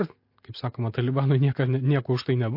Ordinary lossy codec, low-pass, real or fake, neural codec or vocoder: MP3, 32 kbps; 5.4 kHz; real; none